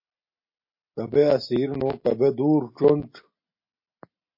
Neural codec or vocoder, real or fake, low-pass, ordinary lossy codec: none; real; 5.4 kHz; MP3, 32 kbps